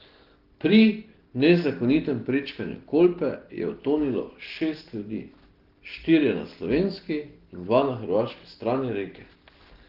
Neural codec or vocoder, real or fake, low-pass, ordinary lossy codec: none; real; 5.4 kHz; Opus, 16 kbps